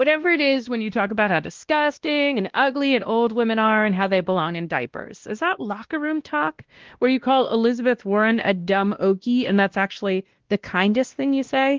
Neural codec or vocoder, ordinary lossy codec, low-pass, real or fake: codec, 16 kHz, 1 kbps, X-Codec, WavLM features, trained on Multilingual LibriSpeech; Opus, 16 kbps; 7.2 kHz; fake